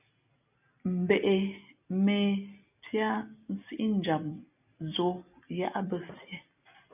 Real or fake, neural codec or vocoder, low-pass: real; none; 3.6 kHz